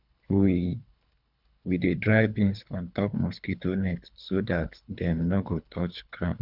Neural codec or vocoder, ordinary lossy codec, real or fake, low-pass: codec, 24 kHz, 3 kbps, HILCodec; none; fake; 5.4 kHz